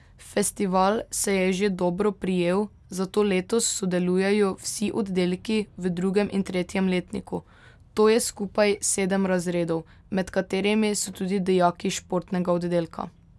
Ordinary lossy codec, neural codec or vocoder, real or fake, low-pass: none; none; real; none